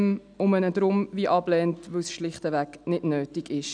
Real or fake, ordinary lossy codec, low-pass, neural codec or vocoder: real; none; 9.9 kHz; none